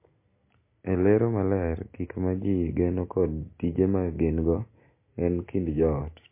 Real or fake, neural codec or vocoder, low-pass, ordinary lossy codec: real; none; 3.6 kHz; MP3, 24 kbps